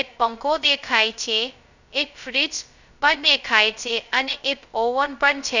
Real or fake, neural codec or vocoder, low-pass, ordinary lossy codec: fake; codec, 16 kHz, 0.2 kbps, FocalCodec; 7.2 kHz; none